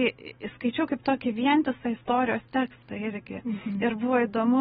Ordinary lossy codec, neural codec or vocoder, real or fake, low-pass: AAC, 16 kbps; none; real; 19.8 kHz